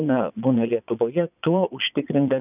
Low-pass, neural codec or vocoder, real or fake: 3.6 kHz; vocoder, 22.05 kHz, 80 mel bands, Vocos; fake